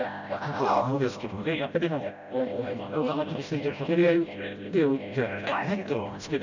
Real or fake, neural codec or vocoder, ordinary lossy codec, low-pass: fake; codec, 16 kHz, 0.5 kbps, FreqCodec, smaller model; none; 7.2 kHz